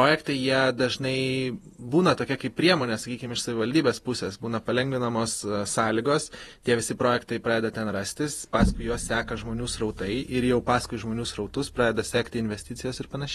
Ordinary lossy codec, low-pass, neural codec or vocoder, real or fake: AAC, 32 kbps; 19.8 kHz; vocoder, 48 kHz, 128 mel bands, Vocos; fake